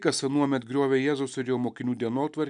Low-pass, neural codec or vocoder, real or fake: 9.9 kHz; none; real